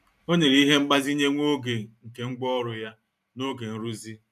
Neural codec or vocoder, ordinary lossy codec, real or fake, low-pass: vocoder, 44.1 kHz, 128 mel bands every 512 samples, BigVGAN v2; none; fake; 14.4 kHz